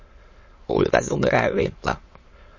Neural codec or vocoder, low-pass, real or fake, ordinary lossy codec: autoencoder, 22.05 kHz, a latent of 192 numbers a frame, VITS, trained on many speakers; 7.2 kHz; fake; MP3, 32 kbps